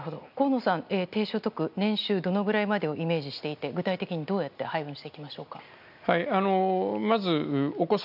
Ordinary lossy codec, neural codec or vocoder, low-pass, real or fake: none; none; 5.4 kHz; real